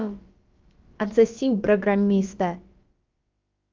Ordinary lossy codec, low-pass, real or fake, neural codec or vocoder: Opus, 24 kbps; 7.2 kHz; fake; codec, 16 kHz, about 1 kbps, DyCAST, with the encoder's durations